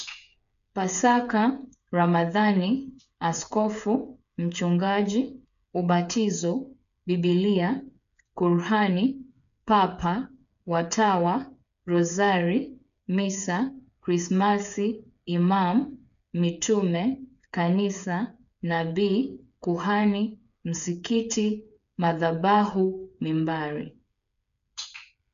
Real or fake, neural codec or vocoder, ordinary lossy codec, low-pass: fake; codec, 16 kHz, 8 kbps, FreqCodec, smaller model; MP3, 96 kbps; 7.2 kHz